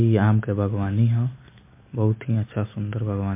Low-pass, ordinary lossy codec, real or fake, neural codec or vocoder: 3.6 kHz; MP3, 24 kbps; real; none